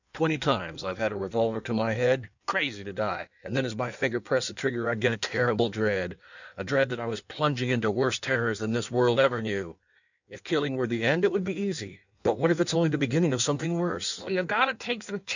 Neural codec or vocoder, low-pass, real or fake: codec, 16 kHz in and 24 kHz out, 1.1 kbps, FireRedTTS-2 codec; 7.2 kHz; fake